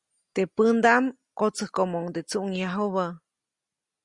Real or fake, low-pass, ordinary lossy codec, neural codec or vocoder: real; 10.8 kHz; Opus, 64 kbps; none